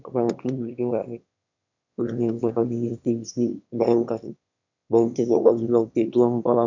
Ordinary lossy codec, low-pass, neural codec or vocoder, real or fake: none; 7.2 kHz; autoencoder, 22.05 kHz, a latent of 192 numbers a frame, VITS, trained on one speaker; fake